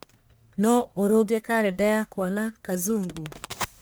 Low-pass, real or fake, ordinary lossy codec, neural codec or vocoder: none; fake; none; codec, 44.1 kHz, 1.7 kbps, Pupu-Codec